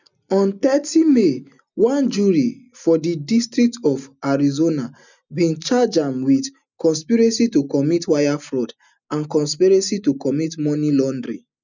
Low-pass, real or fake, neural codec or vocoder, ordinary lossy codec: 7.2 kHz; real; none; none